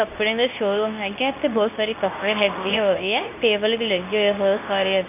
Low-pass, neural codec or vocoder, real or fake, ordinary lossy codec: 3.6 kHz; codec, 24 kHz, 0.9 kbps, WavTokenizer, medium speech release version 2; fake; none